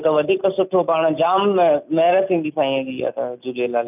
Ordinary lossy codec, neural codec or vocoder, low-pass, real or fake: none; none; 3.6 kHz; real